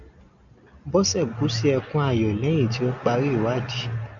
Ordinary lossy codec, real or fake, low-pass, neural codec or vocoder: MP3, 96 kbps; real; 7.2 kHz; none